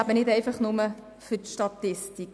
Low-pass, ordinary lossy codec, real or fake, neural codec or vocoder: none; none; real; none